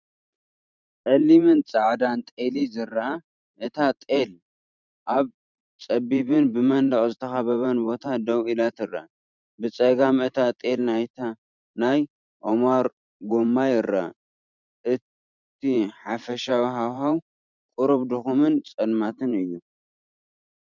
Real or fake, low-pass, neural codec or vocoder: fake; 7.2 kHz; vocoder, 44.1 kHz, 128 mel bands every 512 samples, BigVGAN v2